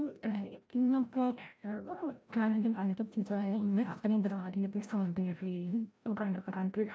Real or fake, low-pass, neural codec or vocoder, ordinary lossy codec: fake; none; codec, 16 kHz, 0.5 kbps, FreqCodec, larger model; none